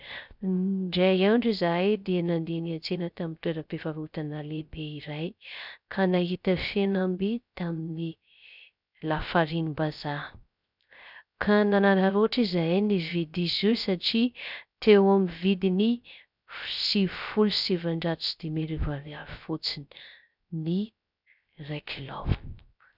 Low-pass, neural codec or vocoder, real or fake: 5.4 kHz; codec, 16 kHz, 0.3 kbps, FocalCodec; fake